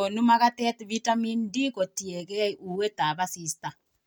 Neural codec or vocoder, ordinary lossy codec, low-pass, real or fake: none; none; none; real